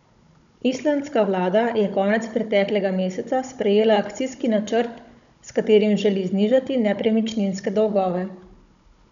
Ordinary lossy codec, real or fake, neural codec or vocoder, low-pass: MP3, 96 kbps; fake; codec, 16 kHz, 16 kbps, FunCodec, trained on Chinese and English, 50 frames a second; 7.2 kHz